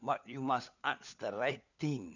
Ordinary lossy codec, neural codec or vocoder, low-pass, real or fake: none; codec, 16 kHz, 4 kbps, FunCodec, trained on LibriTTS, 50 frames a second; 7.2 kHz; fake